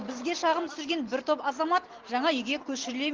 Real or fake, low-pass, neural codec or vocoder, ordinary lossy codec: real; 7.2 kHz; none; Opus, 16 kbps